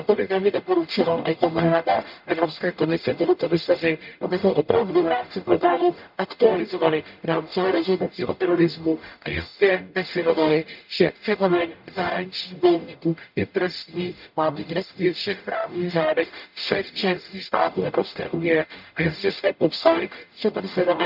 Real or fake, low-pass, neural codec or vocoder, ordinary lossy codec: fake; 5.4 kHz; codec, 44.1 kHz, 0.9 kbps, DAC; none